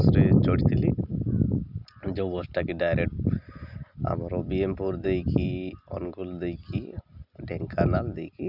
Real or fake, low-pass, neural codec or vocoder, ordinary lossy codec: real; 5.4 kHz; none; none